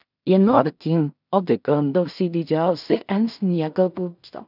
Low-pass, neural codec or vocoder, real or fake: 5.4 kHz; codec, 16 kHz in and 24 kHz out, 0.4 kbps, LongCat-Audio-Codec, two codebook decoder; fake